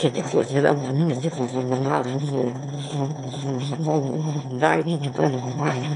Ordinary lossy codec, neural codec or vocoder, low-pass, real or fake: MP3, 64 kbps; autoencoder, 22.05 kHz, a latent of 192 numbers a frame, VITS, trained on one speaker; 9.9 kHz; fake